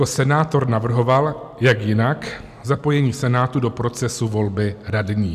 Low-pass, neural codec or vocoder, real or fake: 14.4 kHz; none; real